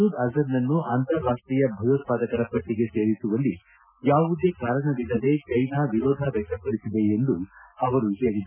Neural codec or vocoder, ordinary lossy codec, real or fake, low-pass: none; none; real; 3.6 kHz